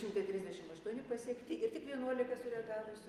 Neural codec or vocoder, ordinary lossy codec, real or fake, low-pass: none; Opus, 32 kbps; real; 14.4 kHz